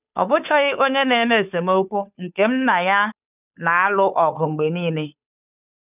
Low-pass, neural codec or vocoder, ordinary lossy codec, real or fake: 3.6 kHz; codec, 16 kHz, 2 kbps, FunCodec, trained on Chinese and English, 25 frames a second; none; fake